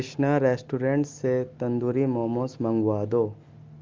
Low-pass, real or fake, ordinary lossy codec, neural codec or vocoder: 7.2 kHz; real; Opus, 32 kbps; none